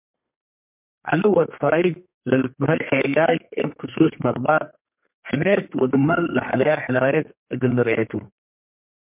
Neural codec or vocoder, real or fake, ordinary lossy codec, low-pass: codec, 32 kHz, 1.9 kbps, SNAC; fake; MP3, 32 kbps; 3.6 kHz